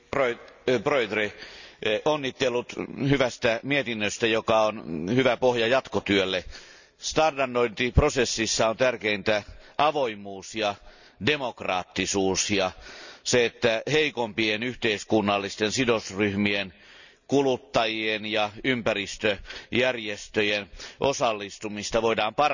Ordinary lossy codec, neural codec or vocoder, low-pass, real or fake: none; none; 7.2 kHz; real